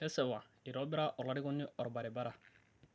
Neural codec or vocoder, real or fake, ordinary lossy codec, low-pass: none; real; none; none